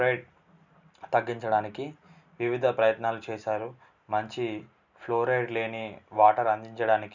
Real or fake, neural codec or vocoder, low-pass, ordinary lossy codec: real; none; 7.2 kHz; none